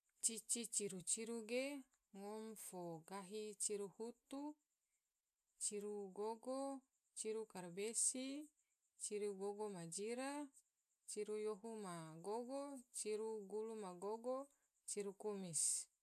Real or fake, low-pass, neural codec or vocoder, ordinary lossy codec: real; 14.4 kHz; none; none